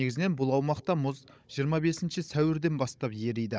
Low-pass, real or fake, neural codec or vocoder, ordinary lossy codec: none; fake; codec, 16 kHz, 16 kbps, FunCodec, trained on Chinese and English, 50 frames a second; none